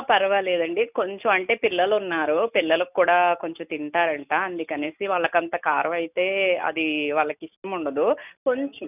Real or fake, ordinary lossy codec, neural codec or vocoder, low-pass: real; none; none; 3.6 kHz